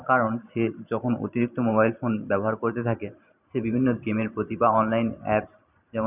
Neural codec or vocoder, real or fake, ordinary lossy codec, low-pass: vocoder, 44.1 kHz, 128 mel bands every 512 samples, BigVGAN v2; fake; none; 3.6 kHz